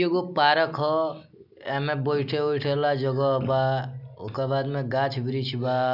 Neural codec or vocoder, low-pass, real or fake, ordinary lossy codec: none; 5.4 kHz; real; none